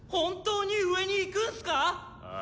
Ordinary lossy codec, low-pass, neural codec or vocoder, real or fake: none; none; none; real